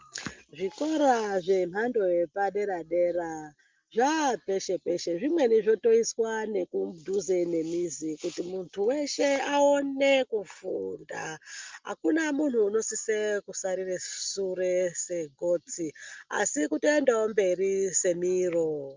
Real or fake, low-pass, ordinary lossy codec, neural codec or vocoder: real; 7.2 kHz; Opus, 24 kbps; none